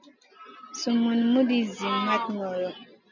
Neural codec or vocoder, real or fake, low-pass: none; real; 7.2 kHz